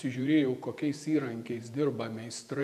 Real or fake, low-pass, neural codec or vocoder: fake; 14.4 kHz; vocoder, 48 kHz, 128 mel bands, Vocos